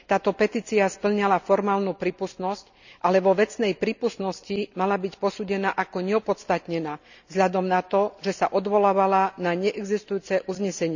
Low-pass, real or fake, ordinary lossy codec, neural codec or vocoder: 7.2 kHz; real; none; none